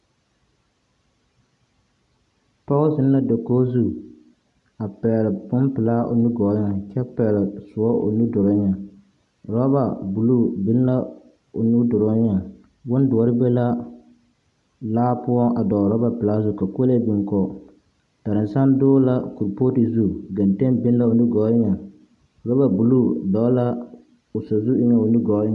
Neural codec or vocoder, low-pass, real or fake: none; 10.8 kHz; real